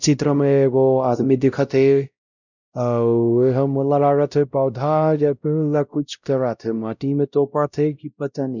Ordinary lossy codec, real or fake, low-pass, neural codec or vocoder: none; fake; 7.2 kHz; codec, 16 kHz, 0.5 kbps, X-Codec, WavLM features, trained on Multilingual LibriSpeech